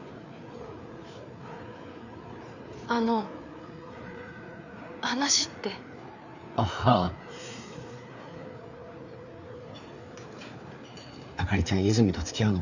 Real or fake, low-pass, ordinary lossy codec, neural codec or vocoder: fake; 7.2 kHz; none; codec, 16 kHz, 4 kbps, FreqCodec, larger model